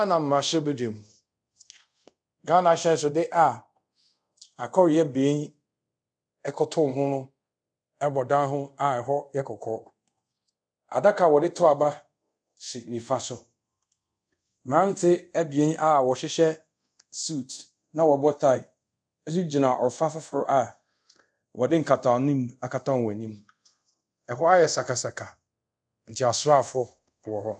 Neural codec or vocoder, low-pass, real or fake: codec, 24 kHz, 0.5 kbps, DualCodec; 9.9 kHz; fake